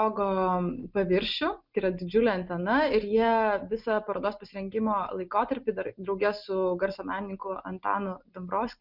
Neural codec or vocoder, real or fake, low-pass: none; real; 5.4 kHz